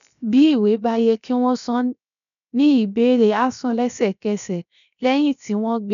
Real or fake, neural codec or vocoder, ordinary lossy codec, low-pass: fake; codec, 16 kHz, 0.7 kbps, FocalCodec; none; 7.2 kHz